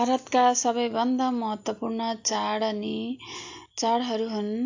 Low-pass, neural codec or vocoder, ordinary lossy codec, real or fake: 7.2 kHz; none; AAC, 48 kbps; real